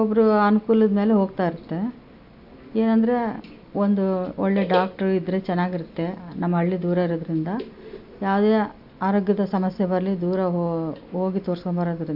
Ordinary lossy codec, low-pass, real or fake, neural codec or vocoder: AAC, 48 kbps; 5.4 kHz; real; none